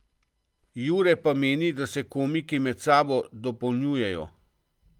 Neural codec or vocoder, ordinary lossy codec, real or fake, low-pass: none; Opus, 32 kbps; real; 19.8 kHz